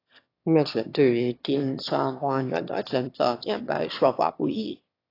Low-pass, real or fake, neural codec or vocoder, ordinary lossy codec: 5.4 kHz; fake; autoencoder, 22.05 kHz, a latent of 192 numbers a frame, VITS, trained on one speaker; AAC, 32 kbps